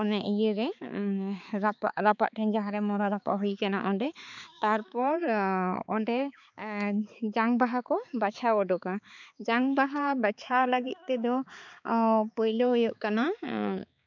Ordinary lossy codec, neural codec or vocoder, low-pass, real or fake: none; codec, 16 kHz, 4 kbps, X-Codec, HuBERT features, trained on balanced general audio; 7.2 kHz; fake